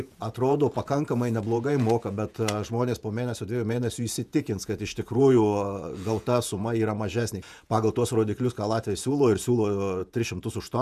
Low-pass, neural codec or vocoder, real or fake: 14.4 kHz; vocoder, 48 kHz, 128 mel bands, Vocos; fake